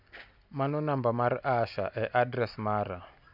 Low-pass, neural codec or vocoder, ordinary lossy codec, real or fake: 5.4 kHz; none; none; real